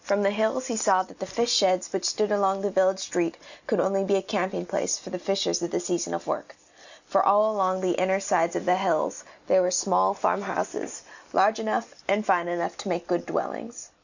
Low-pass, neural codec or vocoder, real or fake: 7.2 kHz; none; real